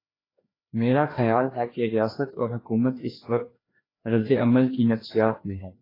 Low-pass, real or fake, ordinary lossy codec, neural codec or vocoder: 5.4 kHz; fake; AAC, 24 kbps; codec, 16 kHz, 2 kbps, FreqCodec, larger model